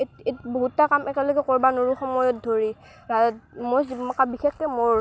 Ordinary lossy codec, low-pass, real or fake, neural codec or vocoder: none; none; real; none